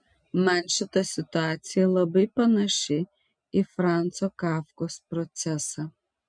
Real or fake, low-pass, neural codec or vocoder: real; 9.9 kHz; none